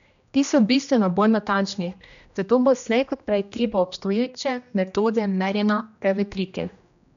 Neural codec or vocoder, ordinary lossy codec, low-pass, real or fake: codec, 16 kHz, 1 kbps, X-Codec, HuBERT features, trained on general audio; none; 7.2 kHz; fake